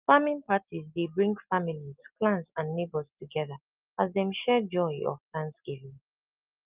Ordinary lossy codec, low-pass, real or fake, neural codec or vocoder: Opus, 24 kbps; 3.6 kHz; real; none